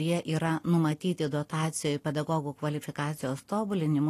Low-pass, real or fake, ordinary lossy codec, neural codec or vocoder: 14.4 kHz; real; AAC, 48 kbps; none